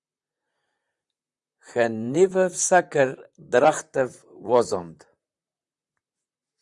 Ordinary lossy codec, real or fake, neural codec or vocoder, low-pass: Opus, 64 kbps; fake; vocoder, 44.1 kHz, 128 mel bands, Pupu-Vocoder; 10.8 kHz